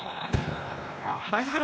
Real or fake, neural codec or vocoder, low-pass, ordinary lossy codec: fake; codec, 16 kHz, 1 kbps, X-Codec, WavLM features, trained on Multilingual LibriSpeech; none; none